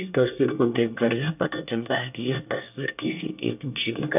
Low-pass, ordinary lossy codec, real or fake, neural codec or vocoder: 3.6 kHz; none; fake; codec, 24 kHz, 1 kbps, SNAC